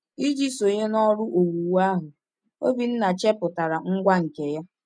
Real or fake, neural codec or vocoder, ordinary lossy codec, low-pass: real; none; none; 9.9 kHz